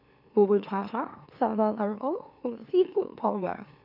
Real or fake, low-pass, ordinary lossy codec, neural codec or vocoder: fake; 5.4 kHz; none; autoencoder, 44.1 kHz, a latent of 192 numbers a frame, MeloTTS